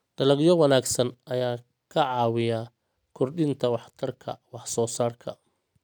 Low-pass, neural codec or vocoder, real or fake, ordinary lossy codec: none; none; real; none